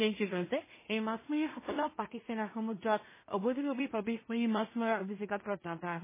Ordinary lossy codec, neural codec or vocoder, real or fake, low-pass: MP3, 16 kbps; codec, 16 kHz in and 24 kHz out, 0.4 kbps, LongCat-Audio-Codec, two codebook decoder; fake; 3.6 kHz